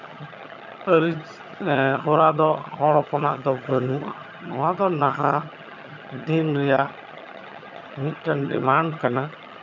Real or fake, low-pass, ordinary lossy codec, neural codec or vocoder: fake; 7.2 kHz; none; vocoder, 22.05 kHz, 80 mel bands, HiFi-GAN